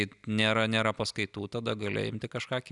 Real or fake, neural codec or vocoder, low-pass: real; none; 10.8 kHz